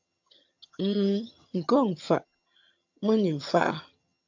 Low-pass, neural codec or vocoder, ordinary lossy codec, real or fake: 7.2 kHz; vocoder, 22.05 kHz, 80 mel bands, HiFi-GAN; AAC, 48 kbps; fake